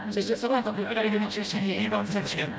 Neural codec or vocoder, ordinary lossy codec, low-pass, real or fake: codec, 16 kHz, 0.5 kbps, FreqCodec, smaller model; none; none; fake